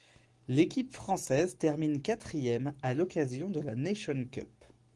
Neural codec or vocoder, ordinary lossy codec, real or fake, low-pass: codec, 44.1 kHz, 7.8 kbps, Pupu-Codec; Opus, 24 kbps; fake; 10.8 kHz